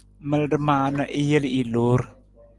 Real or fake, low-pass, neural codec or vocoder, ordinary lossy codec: real; 10.8 kHz; none; Opus, 24 kbps